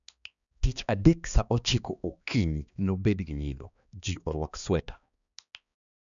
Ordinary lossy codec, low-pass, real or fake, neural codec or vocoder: none; 7.2 kHz; fake; codec, 16 kHz, 2 kbps, X-Codec, HuBERT features, trained on balanced general audio